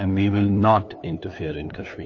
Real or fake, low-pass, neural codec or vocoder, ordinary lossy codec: fake; 7.2 kHz; codec, 16 kHz, 2 kbps, FunCodec, trained on LibriTTS, 25 frames a second; AAC, 48 kbps